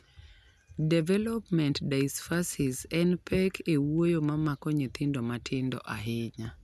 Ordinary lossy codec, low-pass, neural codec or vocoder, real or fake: none; 14.4 kHz; none; real